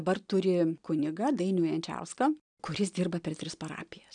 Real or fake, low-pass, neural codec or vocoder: real; 9.9 kHz; none